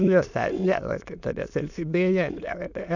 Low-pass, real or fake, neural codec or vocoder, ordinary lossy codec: 7.2 kHz; fake; autoencoder, 22.05 kHz, a latent of 192 numbers a frame, VITS, trained on many speakers; none